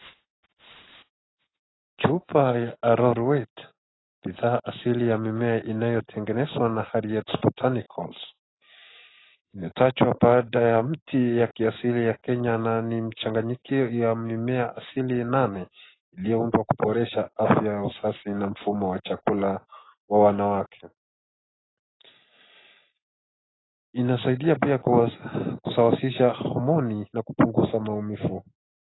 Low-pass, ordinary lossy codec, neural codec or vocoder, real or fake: 7.2 kHz; AAC, 16 kbps; none; real